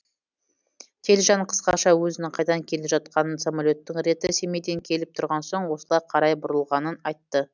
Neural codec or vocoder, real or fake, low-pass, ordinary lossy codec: none; real; 7.2 kHz; none